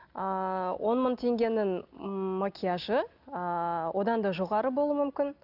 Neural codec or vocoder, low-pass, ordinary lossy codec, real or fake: none; 5.4 kHz; none; real